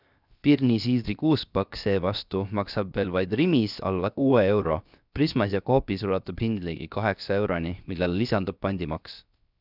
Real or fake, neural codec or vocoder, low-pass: fake; codec, 16 kHz, 0.7 kbps, FocalCodec; 5.4 kHz